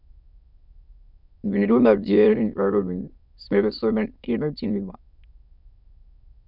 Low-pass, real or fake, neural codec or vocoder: 5.4 kHz; fake; autoencoder, 22.05 kHz, a latent of 192 numbers a frame, VITS, trained on many speakers